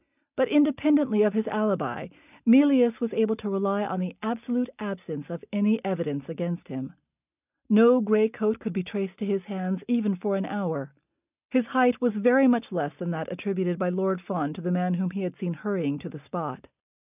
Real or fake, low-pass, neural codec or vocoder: real; 3.6 kHz; none